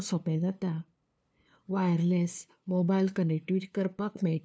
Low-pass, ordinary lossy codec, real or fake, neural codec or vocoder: none; none; fake; codec, 16 kHz, 2 kbps, FunCodec, trained on LibriTTS, 25 frames a second